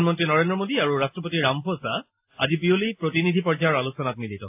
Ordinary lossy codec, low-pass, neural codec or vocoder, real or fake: AAC, 32 kbps; 3.6 kHz; none; real